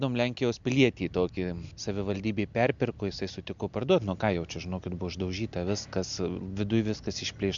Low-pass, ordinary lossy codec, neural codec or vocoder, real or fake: 7.2 kHz; MP3, 64 kbps; none; real